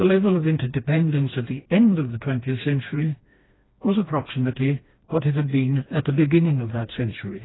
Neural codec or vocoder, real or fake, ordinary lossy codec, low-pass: codec, 16 kHz, 2 kbps, FreqCodec, smaller model; fake; AAC, 16 kbps; 7.2 kHz